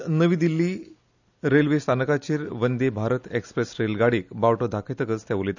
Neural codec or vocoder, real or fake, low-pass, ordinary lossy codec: none; real; 7.2 kHz; none